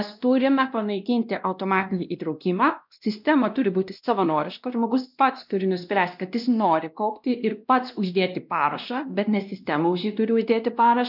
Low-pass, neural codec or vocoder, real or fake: 5.4 kHz; codec, 16 kHz, 1 kbps, X-Codec, WavLM features, trained on Multilingual LibriSpeech; fake